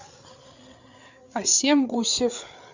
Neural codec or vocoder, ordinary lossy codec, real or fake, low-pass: codec, 16 kHz, 8 kbps, FreqCodec, larger model; Opus, 64 kbps; fake; 7.2 kHz